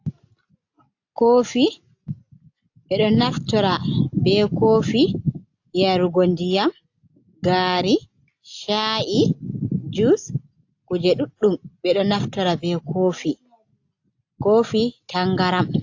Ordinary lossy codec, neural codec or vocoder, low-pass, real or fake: AAC, 48 kbps; none; 7.2 kHz; real